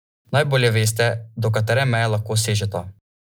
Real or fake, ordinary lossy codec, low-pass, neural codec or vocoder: real; none; none; none